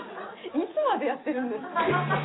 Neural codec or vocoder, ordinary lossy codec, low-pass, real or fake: none; AAC, 16 kbps; 7.2 kHz; real